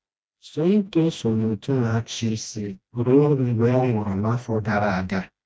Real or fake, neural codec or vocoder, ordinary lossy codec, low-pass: fake; codec, 16 kHz, 1 kbps, FreqCodec, smaller model; none; none